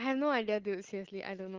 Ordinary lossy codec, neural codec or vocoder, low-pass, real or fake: Opus, 16 kbps; none; 7.2 kHz; real